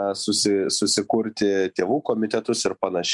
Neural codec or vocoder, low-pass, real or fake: none; 10.8 kHz; real